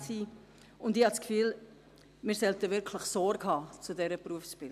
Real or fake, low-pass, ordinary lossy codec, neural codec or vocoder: real; 14.4 kHz; MP3, 96 kbps; none